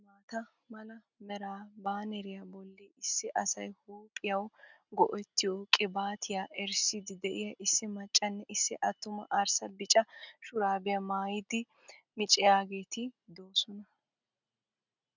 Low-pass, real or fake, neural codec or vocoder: 7.2 kHz; real; none